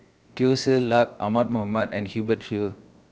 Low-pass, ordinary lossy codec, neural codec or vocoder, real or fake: none; none; codec, 16 kHz, 0.7 kbps, FocalCodec; fake